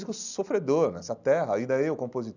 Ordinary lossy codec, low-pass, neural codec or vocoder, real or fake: none; 7.2 kHz; none; real